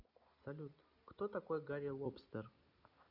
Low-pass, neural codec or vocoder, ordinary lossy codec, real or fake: 5.4 kHz; none; none; real